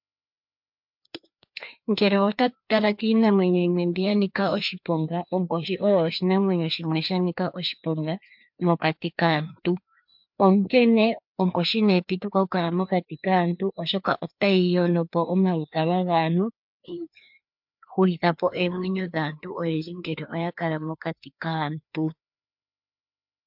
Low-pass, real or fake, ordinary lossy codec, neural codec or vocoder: 5.4 kHz; fake; MP3, 48 kbps; codec, 16 kHz, 2 kbps, FreqCodec, larger model